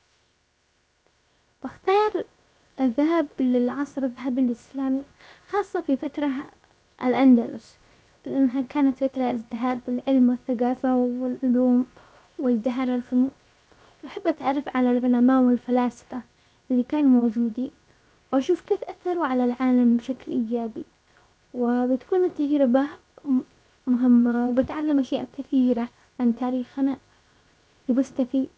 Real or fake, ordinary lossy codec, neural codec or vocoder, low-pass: fake; none; codec, 16 kHz, 0.7 kbps, FocalCodec; none